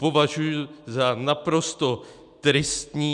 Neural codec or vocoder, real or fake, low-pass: none; real; 10.8 kHz